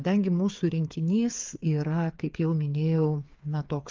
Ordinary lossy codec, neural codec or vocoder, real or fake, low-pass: Opus, 24 kbps; codec, 16 kHz, 8 kbps, FreqCodec, smaller model; fake; 7.2 kHz